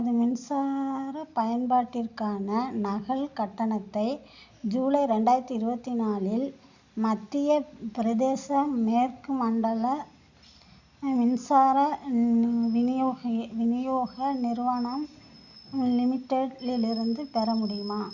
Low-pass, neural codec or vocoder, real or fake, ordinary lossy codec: 7.2 kHz; none; real; Opus, 64 kbps